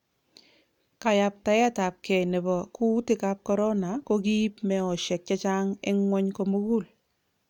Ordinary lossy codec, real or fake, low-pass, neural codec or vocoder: none; real; 19.8 kHz; none